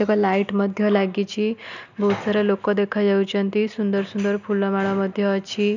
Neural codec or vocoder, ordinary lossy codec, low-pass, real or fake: none; none; 7.2 kHz; real